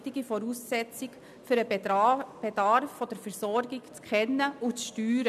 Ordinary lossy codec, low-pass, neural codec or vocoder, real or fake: none; 14.4 kHz; none; real